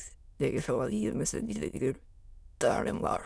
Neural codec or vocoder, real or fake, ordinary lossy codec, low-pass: autoencoder, 22.05 kHz, a latent of 192 numbers a frame, VITS, trained on many speakers; fake; none; none